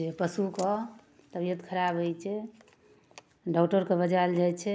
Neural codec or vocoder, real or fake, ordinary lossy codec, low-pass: none; real; none; none